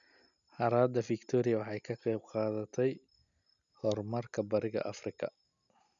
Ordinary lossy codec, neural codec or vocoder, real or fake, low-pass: none; none; real; 7.2 kHz